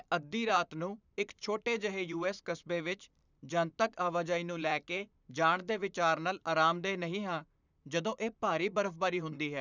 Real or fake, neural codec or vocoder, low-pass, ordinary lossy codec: fake; vocoder, 44.1 kHz, 128 mel bands, Pupu-Vocoder; 7.2 kHz; none